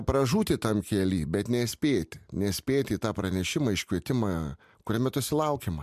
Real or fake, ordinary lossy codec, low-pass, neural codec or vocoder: real; MP3, 96 kbps; 14.4 kHz; none